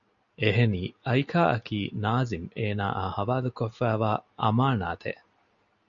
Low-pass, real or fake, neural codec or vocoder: 7.2 kHz; real; none